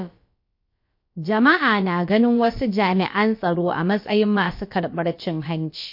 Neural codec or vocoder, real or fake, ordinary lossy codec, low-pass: codec, 16 kHz, about 1 kbps, DyCAST, with the encoder's durations; fake; MP3, 32 kbps; 5.4 kHz